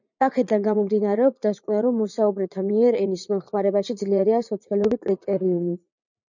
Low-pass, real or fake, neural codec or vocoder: 7.2 kHz; fake; vocoder, 44.1 kHz, 80 mel bands, Vocos